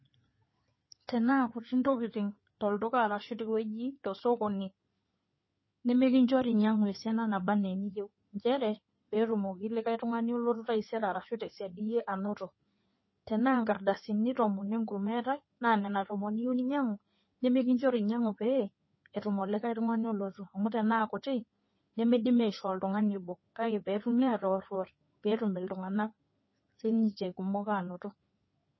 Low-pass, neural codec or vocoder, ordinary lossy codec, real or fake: 7.2 kHz; codec, 16 kHz in and 24 kHz out, 2.2 kbps, FireRedTTS-2 codec; MP3, 24 kbps; fake